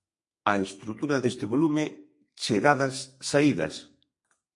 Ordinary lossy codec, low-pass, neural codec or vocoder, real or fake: MP3, 48 kbps; 10.8 kHz; codec, 32 kHz, 1.9 kbps, SNAC; fake